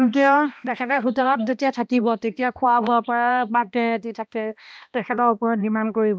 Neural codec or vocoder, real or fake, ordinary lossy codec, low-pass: codec, 16 kHz, 1 kbps, X-Codec, HuBERT features, trained on balanced general audio; fake; none; none